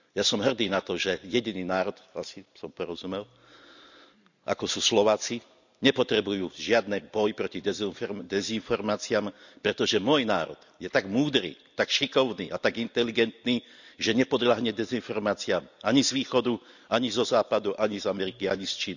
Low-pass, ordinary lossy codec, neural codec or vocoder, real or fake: 7.2 kHz; none; none; real